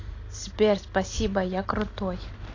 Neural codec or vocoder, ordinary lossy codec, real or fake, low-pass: none; AAC, 32 kbps; real; 7.2 kHz